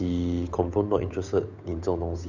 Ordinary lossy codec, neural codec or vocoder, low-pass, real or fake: none; codec, 16 kHz, 8 kbps, FunCodec, trained on Chinese and English, 25 frames a second; 7.2 kHz; fake